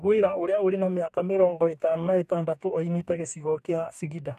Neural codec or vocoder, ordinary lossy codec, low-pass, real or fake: codec, 44.1 kHz, 2.6 kbps, DAC; none; 14.4 kHz; fake